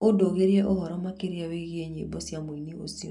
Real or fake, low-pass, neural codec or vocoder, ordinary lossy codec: real; 10.8 kHz; none; none